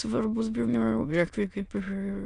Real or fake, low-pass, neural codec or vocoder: fake; 9.9 kHz; autoencoder, 22.05 kHz, a latent of 192 numbers a frame, VITS, trained on many speakers